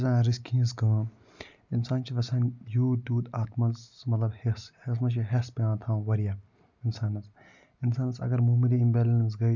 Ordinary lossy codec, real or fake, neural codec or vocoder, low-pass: none; real; none; 7.2 kHz